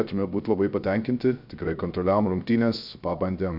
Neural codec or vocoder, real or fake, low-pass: codec, 16 kHz, 0.3 kbps, FocalCodec; fake; 5.4 kHz